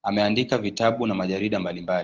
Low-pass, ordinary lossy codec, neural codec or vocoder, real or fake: 7.2 kHz; Opus, 16 kbps; none; real